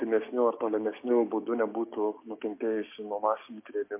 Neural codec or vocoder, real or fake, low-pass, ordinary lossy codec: codec, 16 kHz, 6 kbps, DAC; fake; 3.6 kHz; MP3, 24 kbps